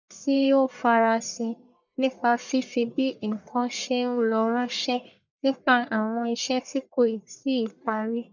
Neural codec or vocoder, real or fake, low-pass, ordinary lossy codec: codec, 44.1 kHz, 1.7 kbps, Pupu-Codec; fake; 7.2 kHz; none